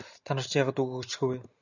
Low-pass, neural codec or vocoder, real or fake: 7.2 kHz; none; real